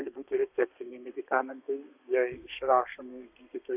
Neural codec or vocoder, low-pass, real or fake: codec, 32 kHz, 1.9 kbps, SNAC; 3.6 kHz; fake